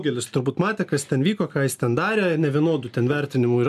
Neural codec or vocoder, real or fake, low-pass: none; real; 14.4 kHz